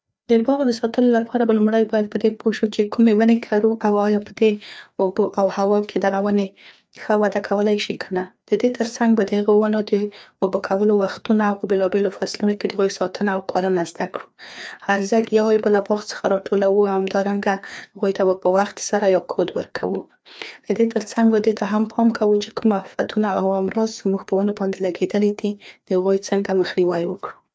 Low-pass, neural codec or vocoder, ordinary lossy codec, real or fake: none; codec, 16 kHz, 2 kbps, FreqCodec, larger model; none; fake